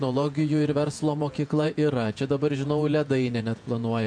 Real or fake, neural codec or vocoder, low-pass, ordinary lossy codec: fake; vocoder, 48 kHz, 128 mel bands, Vocos; 9.9 kHz; MP3, 64 kbps